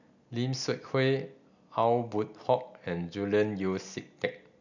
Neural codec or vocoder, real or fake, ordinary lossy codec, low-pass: none; real; none; 7.2 kHz